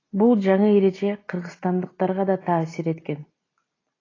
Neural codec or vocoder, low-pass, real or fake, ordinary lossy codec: none; 7.2 kHz; real; AAC, 32 kbps